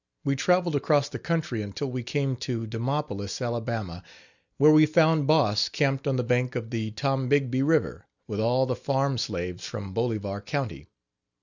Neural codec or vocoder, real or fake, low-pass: none; real; 7.2 kHz